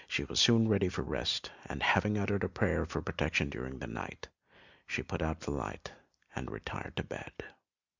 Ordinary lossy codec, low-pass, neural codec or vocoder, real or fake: Opus, 64 kbps; 7.2 kHz; none; real